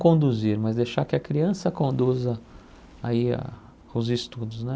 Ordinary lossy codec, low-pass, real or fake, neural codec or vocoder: none; none; real; none